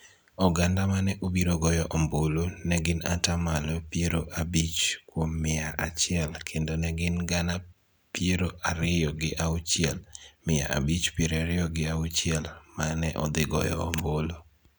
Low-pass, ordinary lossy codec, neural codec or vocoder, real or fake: none; none; none; real